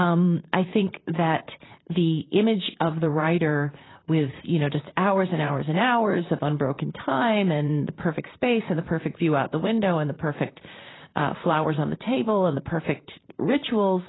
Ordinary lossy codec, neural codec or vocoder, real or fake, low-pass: AAC, 16 kbps; vocoder, 44.1 kHz, 128 mel bands, Pupu-Vocoder; fake; 7.2 kHz